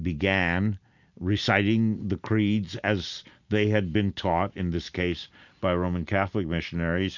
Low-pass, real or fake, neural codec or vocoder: 7.2 kHz; real; none